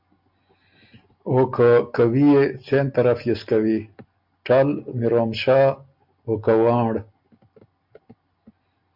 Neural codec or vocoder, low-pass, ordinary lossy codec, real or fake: none; 5.4 kHz; MP3, 48 kbps; real